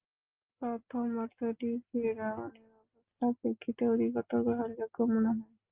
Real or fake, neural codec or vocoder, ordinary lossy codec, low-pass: real; none; Opus, 32 kbps; 3.6 kHz